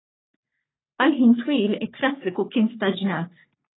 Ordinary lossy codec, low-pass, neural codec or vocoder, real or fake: AAC, 16 kbps; 7.2 kHz; codec, 44.1 kHz, 3.4 kbps, Pupu-Codec; fake